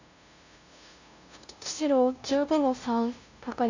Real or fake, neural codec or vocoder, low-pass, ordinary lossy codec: fake; codec, 16 kHz, 0.5 kbps, FunCodec, trained on LibriTTS, 25 frames a second; 7.2 kHz; AAC, 48 kbps